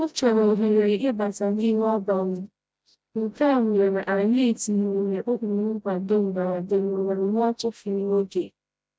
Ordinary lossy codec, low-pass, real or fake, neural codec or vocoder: none; none; fake; codec, 16 kHz, 0.5 kbps, FreqCodec, smaller model